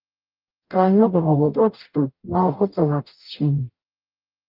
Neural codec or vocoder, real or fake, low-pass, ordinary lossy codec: codec, 44.1 kHz, 0.9 kbps, DAC; fake; 5.4 kHz; Opus, 32 kbps